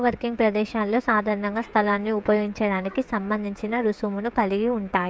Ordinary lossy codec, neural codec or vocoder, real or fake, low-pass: none; codec, 16 kHz, 16 kbps, FreqCodec, smaller model; fake; none